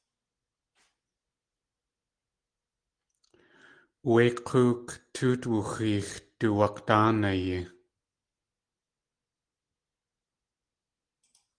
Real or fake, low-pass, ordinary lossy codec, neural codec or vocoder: real; 9.9 kHz; Opus, 24 kbps; none